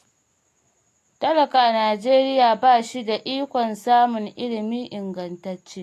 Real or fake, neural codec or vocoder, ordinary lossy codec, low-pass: real; none; AAC, 64 kbps; 14.4 kHz